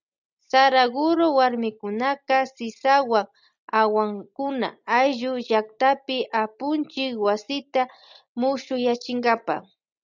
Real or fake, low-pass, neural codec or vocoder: real; 7.2 kHz; none